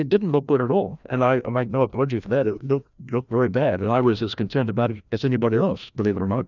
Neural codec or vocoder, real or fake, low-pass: codec, 16 kHz, 1 kbps, FreqCodec, larger model; fake; 7.2 kHz